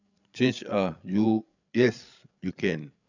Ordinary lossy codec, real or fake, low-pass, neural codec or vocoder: none; fake; 7.2 kHz; codec, 16 kHz, 8 kbps, FreqCodec, larger model